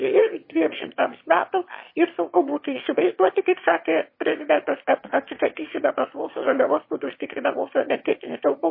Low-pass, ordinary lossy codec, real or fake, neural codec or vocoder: 5.4 kHz; MP3, 24 kbps; fake; autoencoder, 22.05 kHz, a latent of 192 numbers a frame, VITS, trained on one speaker